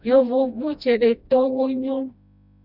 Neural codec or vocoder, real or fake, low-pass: codec, 16 kHz, 1 kbps, FreqCodec, smaller model; fake; 5.4 kHz